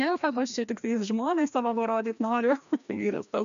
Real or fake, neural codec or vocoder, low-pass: fake; codec, 16 kHz, 1 kbps, FreqCodec, larger model; 7.2 kHz